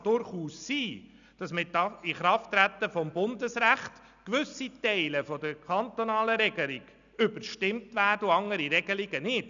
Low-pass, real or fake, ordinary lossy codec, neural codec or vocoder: 7.2 kHz; real; none; none